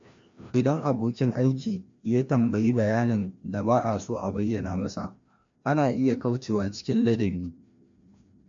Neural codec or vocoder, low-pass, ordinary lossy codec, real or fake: codec, 16 kHz, 1 kbps, FreqCodec, larger model; 7.2 kHz; MP3, 48 kbps; fake